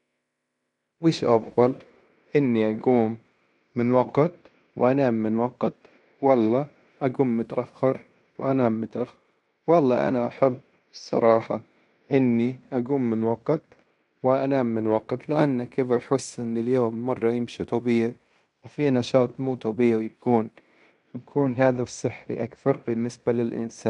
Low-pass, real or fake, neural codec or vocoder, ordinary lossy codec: 10.8 kHz; fake; codec, 16 kHz in and 24 kHz out, 0.9 kbps, LongCat-Audio-Codec, fine tuned four codebook decoder; none